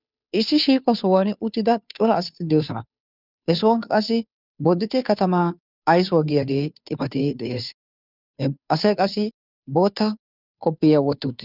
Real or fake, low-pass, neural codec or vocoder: fake; 5.4 kHz; codec, 16 kHz, 2 kbps, FunCodec, trained on Chinese and English, 25 frames a second